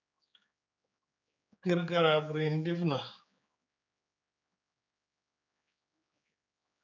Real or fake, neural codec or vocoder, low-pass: fake; codec, 16 kHz, 4 kbps, X-Codec, HuBERT features, trained on general audio; 7.2 kHz